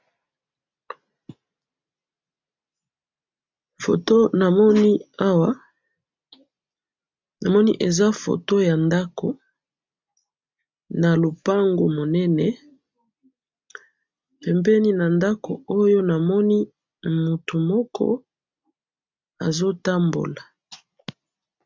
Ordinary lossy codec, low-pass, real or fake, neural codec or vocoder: MP3, 64 kbps; 7.2 kHz; real; none